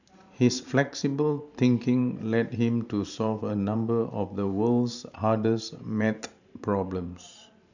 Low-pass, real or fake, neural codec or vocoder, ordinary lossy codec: 7.2 kHz; fake; vocoder, 44.1 kHz, 128 mel bands every 512 samples, BigVGAN v2; none